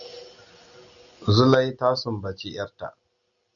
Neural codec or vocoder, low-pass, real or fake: none; 7.2 kHz; real